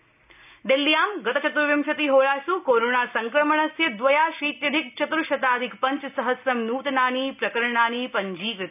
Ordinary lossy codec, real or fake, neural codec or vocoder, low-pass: none; real; none; 3.6 kHz